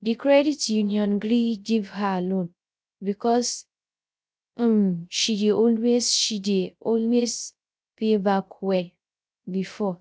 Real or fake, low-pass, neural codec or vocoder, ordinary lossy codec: fake; none; codec, 16 kHz, 0.3 kbps, FocalCodec; none